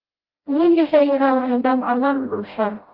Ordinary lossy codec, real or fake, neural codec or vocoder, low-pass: Opus, 32 kbps; fake; codec, 16 kHz, 0.5 kbps, FreqCodec, smaller model; 5.4 kHz